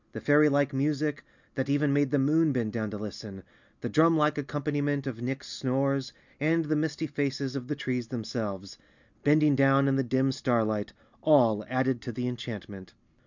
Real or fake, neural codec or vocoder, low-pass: real; none; 7.2 kHz